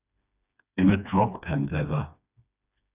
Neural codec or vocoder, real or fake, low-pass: codec, 16 kHz, 2 kbps, FreqCodec, smaller model; fake; 3.6 kHz